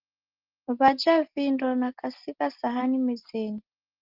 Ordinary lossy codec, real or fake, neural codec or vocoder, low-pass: Opus, 16 kbps; real; none; 5.4 kHz